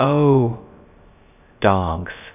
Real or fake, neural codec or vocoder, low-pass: fake; codec, 16 kHz, 0.3 kbps, FocalCodec; 3.6 kHz